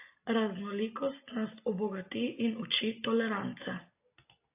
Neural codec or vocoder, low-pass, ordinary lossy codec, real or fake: none; 3.6 kHz; AAC, 24 kbps; real